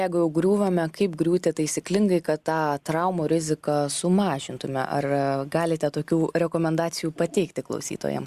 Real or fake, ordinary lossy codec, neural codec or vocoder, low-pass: real; Opus, 64 kbps; none; 14.4 kHz